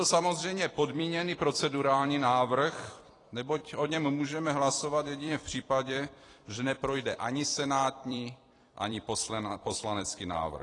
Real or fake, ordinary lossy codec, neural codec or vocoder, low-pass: fake; AAC, 32 kbps; vocoder, 44.1 kHz, 128 mel bands every 256 samples, BigVGAN v2; 10.8 kHz